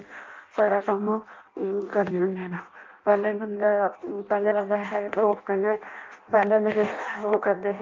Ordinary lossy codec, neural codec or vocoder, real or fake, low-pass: Opus, 32 kbps; codec, 16 kHz in and 24 kHz out, 0.6 kbps, FireRedTTS-2 codec; fake; 7.2 kHz